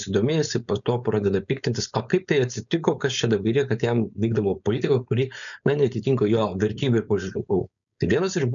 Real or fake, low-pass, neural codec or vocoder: fake; 7.2 kHz; codec, 16 kHz, 4.8 kbps, FACodec